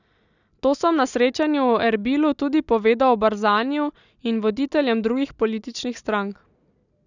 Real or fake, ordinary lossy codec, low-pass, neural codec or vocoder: real; none; 7.2 kHz; none